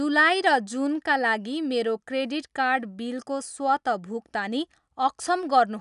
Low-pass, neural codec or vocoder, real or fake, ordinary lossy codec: 10.8 kHz; none; real; none